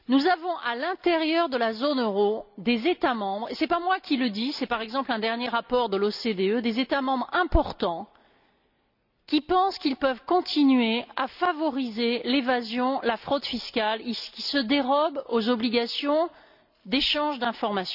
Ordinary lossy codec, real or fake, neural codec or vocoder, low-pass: none; real; none; 5.4 kHz